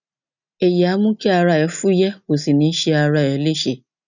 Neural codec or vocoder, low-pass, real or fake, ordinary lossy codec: none; 7.2 kHz; real; none